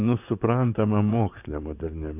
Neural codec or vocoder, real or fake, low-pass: vocoder, 44.1 kHz, 128 mel bands, Pupu-Vocoder; fake; 3.6 kHz